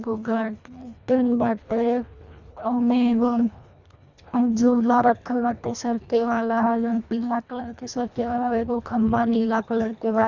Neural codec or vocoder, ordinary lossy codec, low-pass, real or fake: codec, 24 kHz, 1.5 kbps, HILCodec; none; 7.2 kHz; fake